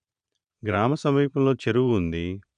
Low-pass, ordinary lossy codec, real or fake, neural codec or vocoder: 9.9 kHz; none; fake; vocoder, 22.05 kHz, 80 mel bands, Vocos